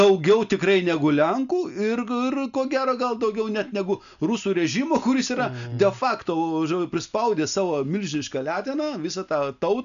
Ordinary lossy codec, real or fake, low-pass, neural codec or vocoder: MP3, 96 kbps; real; 7.2 kHz; none